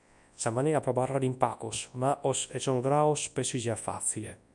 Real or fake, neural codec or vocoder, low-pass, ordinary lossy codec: fake; codec, 24 kHz, 0.9 kbps, WavTokenizer, large speech release; 10.8 kHz; MP3, 96 kbps